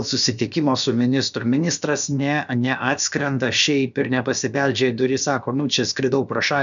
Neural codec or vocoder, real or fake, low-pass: codec, 16 kHz, about 1 kbps, DyCAST, with the encoder's durations; fake; 7.2 kHz